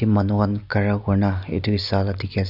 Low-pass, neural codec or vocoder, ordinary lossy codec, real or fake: 5.4 kHz; none; none; real